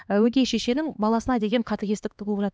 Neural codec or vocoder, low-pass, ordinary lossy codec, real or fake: codec, 16 kHz, 2 kbps, X-Codec, HuBERT features, trained on LibriSpeech; none; none; fake